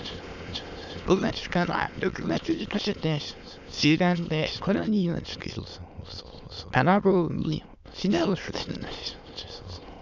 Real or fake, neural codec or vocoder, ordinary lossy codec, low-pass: fake; autoencoder, 22.05 kHz, a latent of 192 numbers a frame, VITS, trained on many speakers; none; 7.2 kHz